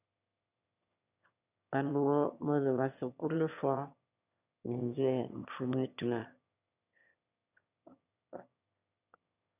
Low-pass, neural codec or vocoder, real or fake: 3.6 kHz; autoencoder, 22.05 kHz, a latent of 192 numbers a frame, VITS, trained on one speaker; fake